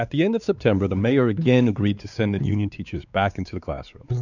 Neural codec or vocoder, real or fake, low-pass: codec, 16 kHz, 4 kbps, X-Codec, WavLM features, trained on Multilingual LibriSpeech; fake; 7.2 kHz